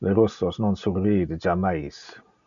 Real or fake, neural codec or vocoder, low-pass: real; none; 7.2 kHz